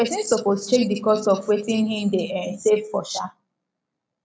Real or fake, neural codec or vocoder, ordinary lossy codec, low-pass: real; none; none; none